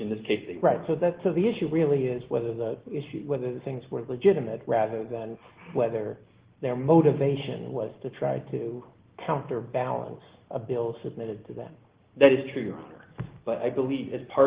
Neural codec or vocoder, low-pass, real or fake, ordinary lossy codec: none; 3.6 kHz; real; Opus, 16 kbps